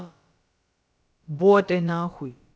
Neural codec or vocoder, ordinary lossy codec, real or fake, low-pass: codec, 16 kHz, about 1 kbps, DyCAST, with the encoder's durations; none; fake; none